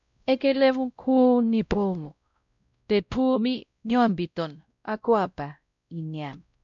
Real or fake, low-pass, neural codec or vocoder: fake; 7.2 kHz; codec, 16 kHz, 0.5 kbps, X-Codec, WavLM features, trained on Multilingual LibriSpeech